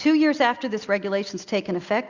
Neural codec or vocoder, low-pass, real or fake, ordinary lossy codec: none; 7.2 kHz; real; Opus, 64 kbps